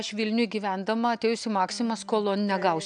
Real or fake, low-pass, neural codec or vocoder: real; 9.9 kHz; none